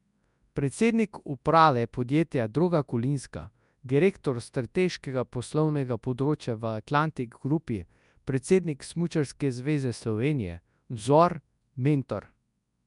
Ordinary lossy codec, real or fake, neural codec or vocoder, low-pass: none; fake; codec, 24 kHz, 0.9 kbps, WavTokenizer, large speech release; 10.8 kHz